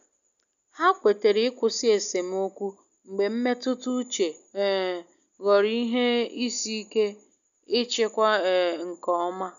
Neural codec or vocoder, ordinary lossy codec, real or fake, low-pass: none; none; real; 7.2 kHz